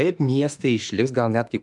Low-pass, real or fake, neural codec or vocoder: 10.8 kHz; fake; autoencoder, 48 kHz, 32 numbers a frame, DAC-VAE, trained on Japanese speech